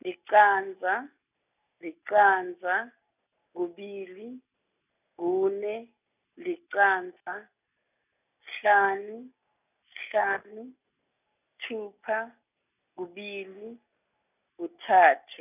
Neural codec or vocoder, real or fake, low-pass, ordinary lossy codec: none; real; 3.6 kHz; none